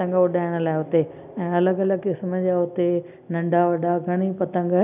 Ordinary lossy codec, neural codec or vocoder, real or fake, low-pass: none; none; real; 3.6 kHz